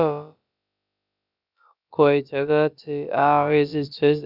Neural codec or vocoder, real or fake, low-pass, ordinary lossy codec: codec, 16 kHz, about 1 kbps, DyCAST, with the encoder's durations; fake; 5.4 kHz; none